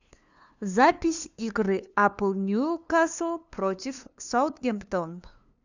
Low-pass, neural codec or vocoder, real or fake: 7.2 kHz; codec, 16 kHz, 2 kbps, FunCodec, trained on LibriTTS, 25 frames a second; fake